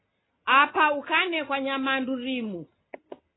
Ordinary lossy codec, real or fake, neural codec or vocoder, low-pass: AAC, 16 kbps; real; none; 7.2 kHz